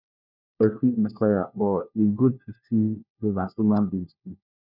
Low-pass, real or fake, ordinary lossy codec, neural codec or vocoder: 5.4 kHz; fake; none; codec, 16 kHz, 1 kbps, FunCodec, trained on LibriTTS, 50 frames a second